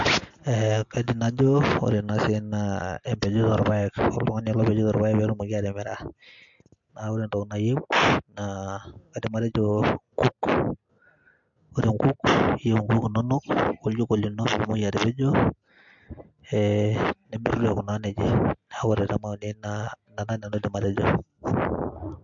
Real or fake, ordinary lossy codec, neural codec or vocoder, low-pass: real; MP3, 48 kbps; none; 7.2 kHz